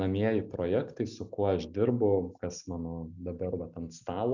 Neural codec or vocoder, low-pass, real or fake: none; 7.2 kHz; real